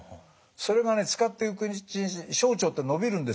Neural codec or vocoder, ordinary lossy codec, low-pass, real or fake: none; none; none; real